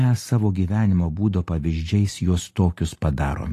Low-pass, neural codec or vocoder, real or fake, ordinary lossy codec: 14.4 kHz; none; real; AAC, 48 kbps